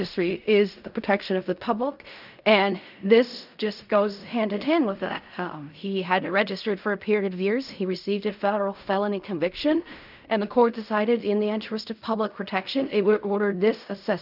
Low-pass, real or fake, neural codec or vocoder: 5.4 kHz; fake; codec, 16 kHz in and 24 kHz out, 0.4 kbps, LongCat-Audio-Codec, fine tuned four codebook decoder